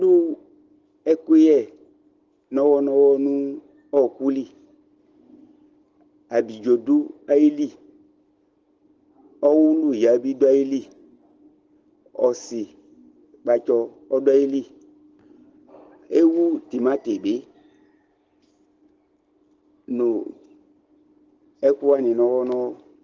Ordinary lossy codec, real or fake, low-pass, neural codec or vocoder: Opus, 16 kbps; real; 7.2 kHz; none